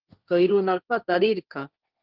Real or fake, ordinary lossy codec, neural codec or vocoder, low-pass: fake; Opus, 24 kbps; codec, 16 kHz, 1.1 kbps, Voila-Tokenizer; 5.4 kHz